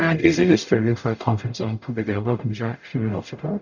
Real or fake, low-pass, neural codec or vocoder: fake; 7.2 kHz; codec, 44.1 kHz, 0.9 kbps, DAC